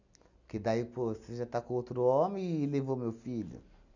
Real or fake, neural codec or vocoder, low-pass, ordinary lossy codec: real; none; 7.2 kHz; none